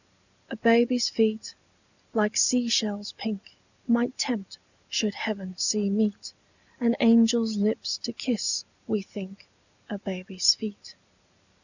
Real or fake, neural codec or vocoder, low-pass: real; none; 7.2 kHz